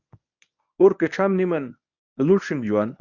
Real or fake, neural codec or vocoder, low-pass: fake; codec, 24 kHz, 0.9 kbps, WavTokenizer, medium speech release version 1; 7.2 kHz